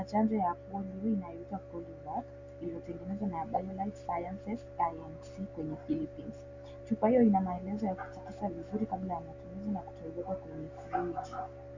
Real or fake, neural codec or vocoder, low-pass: real; none; 7.2 kHz